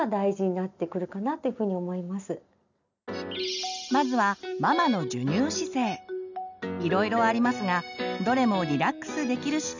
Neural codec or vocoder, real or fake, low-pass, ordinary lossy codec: none; real; 7.2 kHz; none